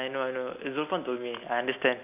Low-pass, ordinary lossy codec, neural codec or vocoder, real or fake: 3.6 kHz; none; none; real